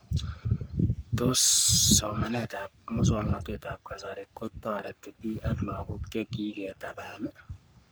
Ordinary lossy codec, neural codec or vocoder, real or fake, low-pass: none; codec, 44.1 kHz, 3.4 kbps, Pupu-Codec; fake; none